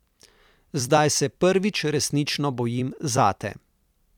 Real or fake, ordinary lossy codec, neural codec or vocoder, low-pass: fake; none; vocoder, 44.1 kHz, 128 mel bands every 256 samples, BigVGAN v2; 19.8 kHz